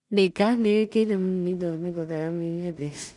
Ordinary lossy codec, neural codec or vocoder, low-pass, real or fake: none; codec, 16 kHz in and 24 kHz out, 0.4 kbps, LongCat-Audio-Codec, two codebook decoder; 10.8 kHz; fake